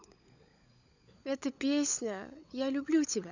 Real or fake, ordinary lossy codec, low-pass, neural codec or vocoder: fake; none; 7.2 kHz; codec, 16 kHz, 16 kbps, FunCodec, trained on LibriTTS, 50 frames a second